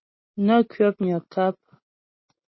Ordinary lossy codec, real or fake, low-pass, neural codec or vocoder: MP3, 24 kbps; real; 7.2 kHz; none